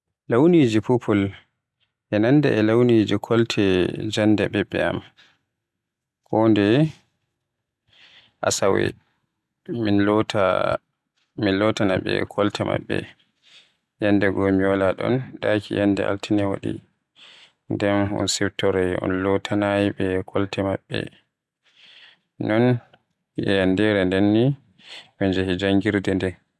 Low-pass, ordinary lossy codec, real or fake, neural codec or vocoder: none; none; real; none